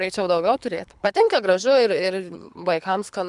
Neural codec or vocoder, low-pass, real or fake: codec, 24 kHz, 3 kbps, HILCodec; 10.8 kHz; fake